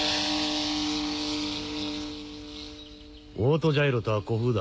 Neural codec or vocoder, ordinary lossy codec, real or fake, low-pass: none; none; real; none